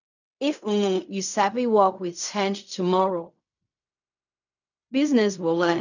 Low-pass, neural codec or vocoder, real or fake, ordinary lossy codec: 7.2 kHz; codec, 16 kHz in and 24 kHz out, 0.4 kbps, LongCat-Audio-Codec, fine tuned four codebook decoder; fake; none